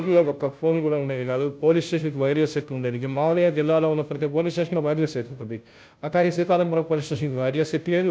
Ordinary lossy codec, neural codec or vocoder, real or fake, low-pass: none; codec, 16 kHz, 0.5 kbps, FunCodec, trained on Chinese and English, 25 frames a second; fake; none